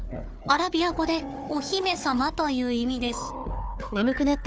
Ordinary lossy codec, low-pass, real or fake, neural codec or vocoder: none; none; fake; codec, 16 kHz, 4 kbps, FunCodec, trained on Chinese and English, 50 frames a second